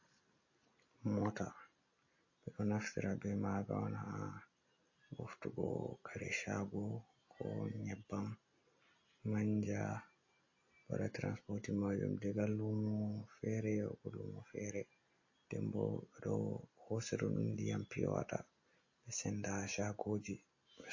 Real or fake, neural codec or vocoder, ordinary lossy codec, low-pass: real; none; MP3, 32 kbps; 7.2 kHz